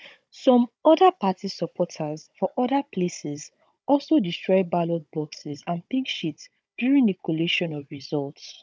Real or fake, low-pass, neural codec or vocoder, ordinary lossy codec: fake; none; codec, 16 kHz, 16 kbps, FunCodec, trained on Chinese and English, 50 frames a second; none